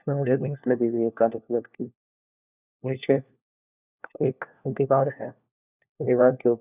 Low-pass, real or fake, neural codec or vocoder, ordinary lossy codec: 3.6 kHz; fake; codec, 16 kHz, 1 kbps, FunCodec, trained on LibriTTS, 50 frames a second; none